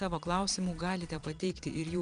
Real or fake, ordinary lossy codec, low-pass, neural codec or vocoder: fake; Opus, 64 kbps; 9.9 kHz; vocoder, 22.05 kHz, 80 mel bands, Vocos